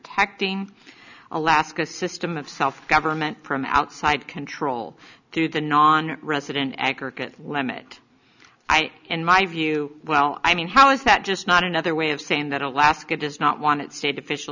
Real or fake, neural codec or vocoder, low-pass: real; none; 7.2 kHz